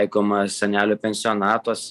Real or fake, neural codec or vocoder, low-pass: real; none; 14.4 kHz